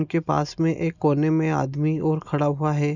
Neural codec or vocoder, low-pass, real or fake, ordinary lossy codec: none; 7.2 kHz; real; none